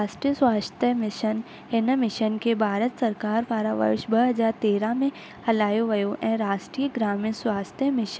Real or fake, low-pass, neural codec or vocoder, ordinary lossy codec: real; none; none; none